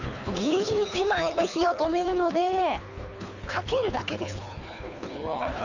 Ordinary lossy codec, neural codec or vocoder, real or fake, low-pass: none; codec, 24 kHz, 3 kbps, HILCodec; fake; 7.2 kHz